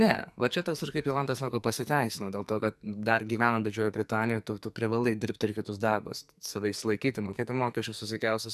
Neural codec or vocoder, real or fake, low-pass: codec, 44.1 kHz, 2.6 kbps, SNAC; fake; 14.4 kHz